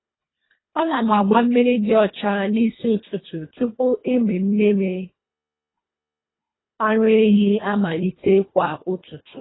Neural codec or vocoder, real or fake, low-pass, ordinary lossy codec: codec, 24 kHz, 1.5 kbps, HILCodec; fake; 7.2 kHz; AAC, 16 kbps